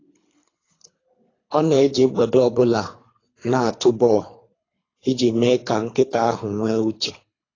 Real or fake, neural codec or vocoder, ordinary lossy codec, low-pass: fake; codec, 24 kHz, 3 kbps, HILCodec; AAC, 32 kbps; 7.2 kHz